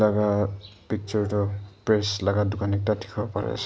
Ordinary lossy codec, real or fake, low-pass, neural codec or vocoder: none; real; none; none